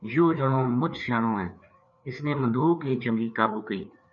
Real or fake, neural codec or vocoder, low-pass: fake; codec, 16 kHz, 4 kbps, FreqCodec, larger model; 7.2 kHz